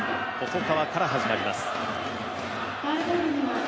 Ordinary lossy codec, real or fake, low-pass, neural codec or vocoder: none; real; none; none